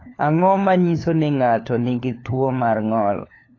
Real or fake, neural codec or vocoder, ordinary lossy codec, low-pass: fake; codec, 16 kHz, 4 kbps, FunCodec, trained on LibriTTS, 50 frames a second; AAC, 32 kbps; 7.2 kHz